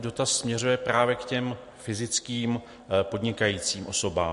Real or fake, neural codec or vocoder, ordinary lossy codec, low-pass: real; none; MP3, 48 kbps; 14.4 kHz